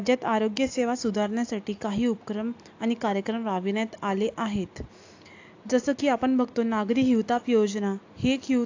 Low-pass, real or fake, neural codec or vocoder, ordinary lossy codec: 7.2 kHz; real; none; AAC, 48 kbps